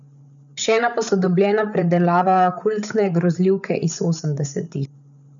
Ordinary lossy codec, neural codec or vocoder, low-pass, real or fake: none; codec, 16 kHz, 8 kbps, FreqCodec, larger model; 7.2 kHz; fake